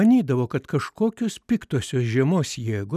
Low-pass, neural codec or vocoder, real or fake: 14.4 kHz; none; real